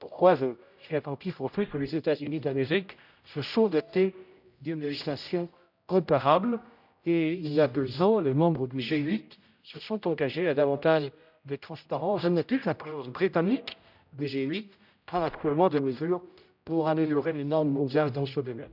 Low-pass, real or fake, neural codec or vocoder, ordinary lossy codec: 5.4 kHz; fake; codec, 16 kHz, 0.5 kbps, X-Codec, HuBERT features, trained on general audio; none